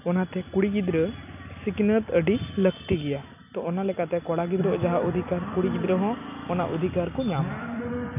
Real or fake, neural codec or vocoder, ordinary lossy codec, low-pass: real; none; none; 3.6 kHz